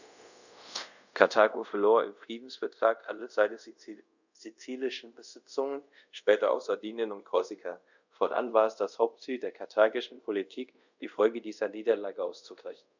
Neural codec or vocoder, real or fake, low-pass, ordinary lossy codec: codec, 24 kHz, 0.5 kbps, DualCodec; fake; 7.2 kHz; none